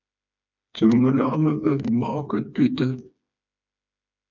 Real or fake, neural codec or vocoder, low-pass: fake; codec, 16 kHz, 2 kbps, FreqCodec, smaller model; 7.2 kHz